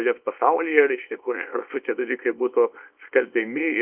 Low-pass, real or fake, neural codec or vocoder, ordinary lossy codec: 3.6 kHz; fake; codec, 24 kHz, 0.9 kbps, WavTokenizer, small release; Opus, 24 kbps